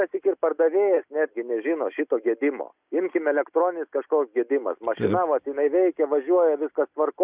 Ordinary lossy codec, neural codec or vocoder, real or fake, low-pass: Opus, 64 kbps; none; real; 3.6 kHz